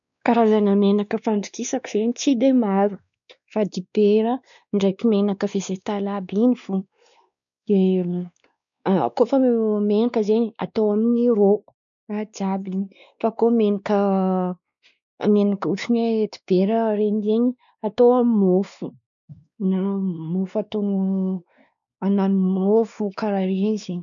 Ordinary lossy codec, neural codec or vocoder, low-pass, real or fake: none; codec, 16 kHz, 2 kbps, X-Codec, WavLM features, trained on Multilingual LibriSpeech; 7.2 kHz; fake